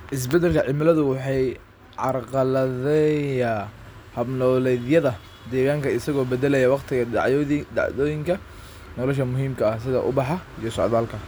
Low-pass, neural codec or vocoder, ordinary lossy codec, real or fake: none; none; none; real